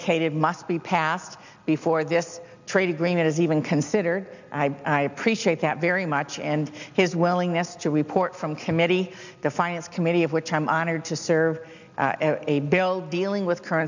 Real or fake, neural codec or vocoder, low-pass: real; none; 7.2 kHz